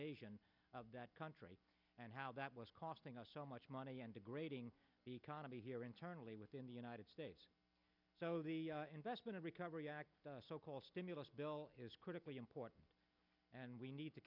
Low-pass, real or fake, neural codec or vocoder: 5.4 kHz; real; none